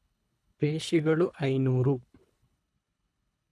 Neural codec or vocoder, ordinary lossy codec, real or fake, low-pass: codec, 24 kHz, 3 kbps, HILCodec; none; fake; none